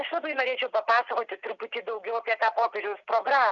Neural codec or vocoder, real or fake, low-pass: none; real; 7.2 kHz